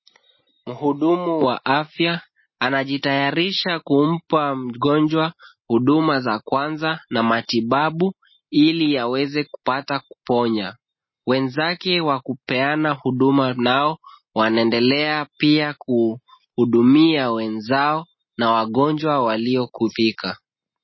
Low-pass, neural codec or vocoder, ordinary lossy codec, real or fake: 7.2 kHz; none; MP3, 24 kbps; real